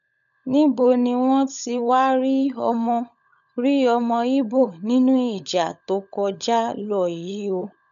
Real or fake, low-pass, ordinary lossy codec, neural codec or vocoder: fake; 7.2 kHz; none; codec, 16 kHz, 16 kbps, FunCodec, trained on LibriTTS, 50 frames a second